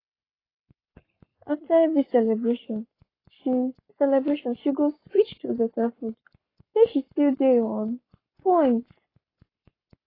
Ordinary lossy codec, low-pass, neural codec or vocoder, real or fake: AAC, 24 kbps; 5.4 kHz; codec, 44.1 kHz, 7.8 kbps, Pupu-Codec; fake